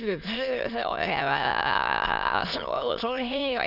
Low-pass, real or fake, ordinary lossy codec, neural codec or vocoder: 5.4 kHz; fake; none; autoencoder, 22.05 kHz, a latent of 192 numbers a frame, VITS, trained on many speakers